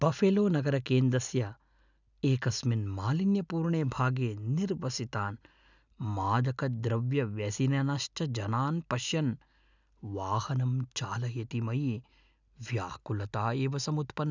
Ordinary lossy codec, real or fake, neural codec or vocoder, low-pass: none; real; none; 7.2 kHz